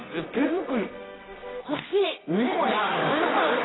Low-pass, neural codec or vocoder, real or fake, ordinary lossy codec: 7.2 kHz; codec, 24 kHz, 0.9 kbps, WavTokenizer, medium music audio release; fake; AAC, 16 kbps